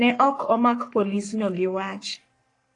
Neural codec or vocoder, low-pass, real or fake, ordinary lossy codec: codec, 44.1 kHz, 3.4 kbps, Pupu-Codec; 10.8 kHz; fake; AAC, 48 kbps